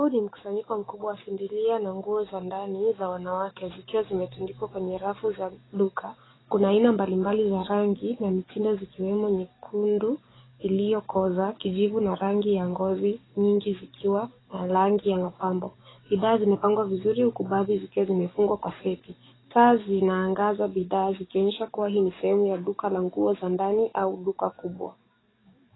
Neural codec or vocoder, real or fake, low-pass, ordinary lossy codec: codec, 44.1 kHz, 7.8 kbps, DAC; fake; 7.2 kHz; AAC, 16 kbps